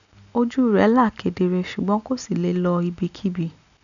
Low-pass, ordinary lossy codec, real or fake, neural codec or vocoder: 7.2 kHz; none; real; none